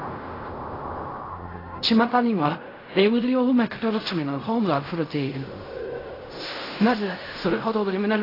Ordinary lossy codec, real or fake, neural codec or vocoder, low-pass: AAC, 24 kbps; fake; codec, 16 kHz in and 24 kHz out, 0.4 kbps, LongCat-Audio-Codec, fine tuned four codebook decoder; 5.4 kHz